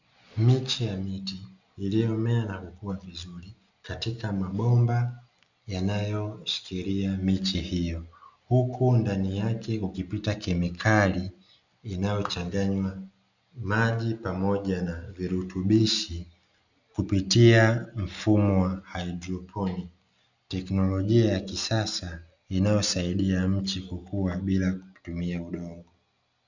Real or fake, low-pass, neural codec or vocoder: real; 7.2 kHz; none